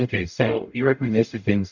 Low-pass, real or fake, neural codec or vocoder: 7.2 kHz; fake; codec, 44.1 kHz, 0.9 kbps, DAC